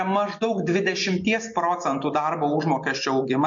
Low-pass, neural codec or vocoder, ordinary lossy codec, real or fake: 7.2 kHz; none; MP3, 48 kbps; real